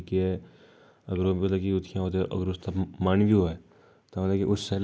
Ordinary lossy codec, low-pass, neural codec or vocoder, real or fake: none; none; none; real